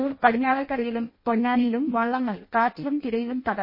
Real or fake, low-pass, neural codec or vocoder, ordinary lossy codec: fake; 5.4 kHz; codec, 16 kHz in and 24 kHz out, 0.6 kbps, FireRedTTS-2 codec; MP3, 24 kbps